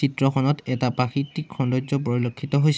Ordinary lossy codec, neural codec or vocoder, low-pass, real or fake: none; none; none; real